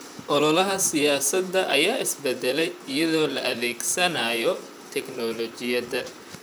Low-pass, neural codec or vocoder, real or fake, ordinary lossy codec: none; vocoder, 44.1 kHz, 128 mel bands, Pupu-Vocoder; fake; none